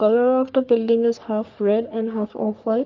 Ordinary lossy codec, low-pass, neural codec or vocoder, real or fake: Opus, 32 kbps; 7.2 kHz; codec, 44.1 kHz, 3.4 kbps, Pupu-Codec; fake